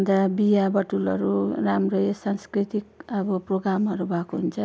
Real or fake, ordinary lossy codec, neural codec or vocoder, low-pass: real; none; none; none